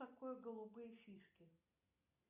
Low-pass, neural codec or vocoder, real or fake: 3.6 kHz; none; real